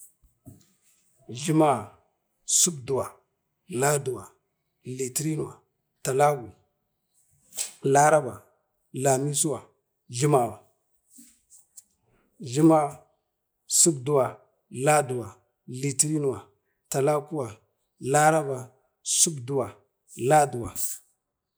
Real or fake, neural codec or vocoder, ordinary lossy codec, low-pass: real; none; none; none